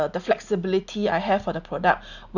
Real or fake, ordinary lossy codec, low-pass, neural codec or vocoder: real; none; 7.2 kHz; none